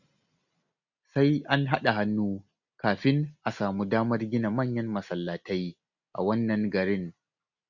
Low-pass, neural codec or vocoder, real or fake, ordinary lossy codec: 7.2 kHz; none; real; none